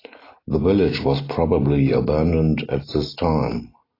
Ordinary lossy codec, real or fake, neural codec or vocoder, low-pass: AAC, 24 kbps; real; none; 5.4 kHz